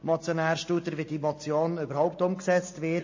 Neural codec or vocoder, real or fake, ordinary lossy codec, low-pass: none; real; MP3, 32 kbps; 7.2 kHz